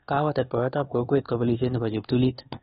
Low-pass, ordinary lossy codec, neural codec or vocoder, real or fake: 10.8 kHz; AAC, 16 kbps; codec, 24 kHz, 1.2 kbps, DualCodec; fake